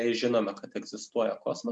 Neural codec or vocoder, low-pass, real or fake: none; 9.9 kHz; real